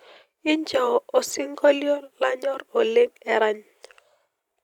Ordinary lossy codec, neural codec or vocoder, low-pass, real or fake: none; vocoder, 44.1 kHz, 128 mel bands every 512 samples, BigVGAN v2; 19.8 kHz; fake